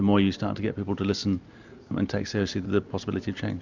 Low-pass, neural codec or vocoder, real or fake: 7.2 kHz; none; real